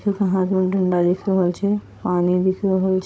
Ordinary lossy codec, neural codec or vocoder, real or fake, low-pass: none; codec, 16 kHz, 16 kbps, FunCodec, trained on Chinese and English, 50 frames a second; fake; none